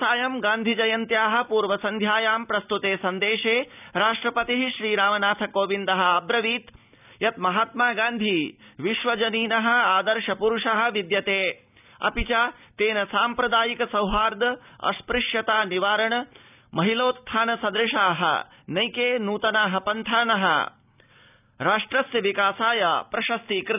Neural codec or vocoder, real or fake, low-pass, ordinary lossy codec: none; real; 3.6 kHz; none